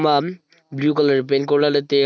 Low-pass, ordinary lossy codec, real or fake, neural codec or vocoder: none; none; real; none